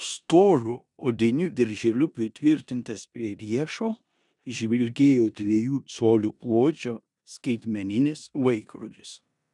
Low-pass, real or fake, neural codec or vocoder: 10.8 kHz; fake; codec, 16 kHz in and 24 kHz out, 0.9 kbps, LongCat-Audio-Codec, four codebook decoder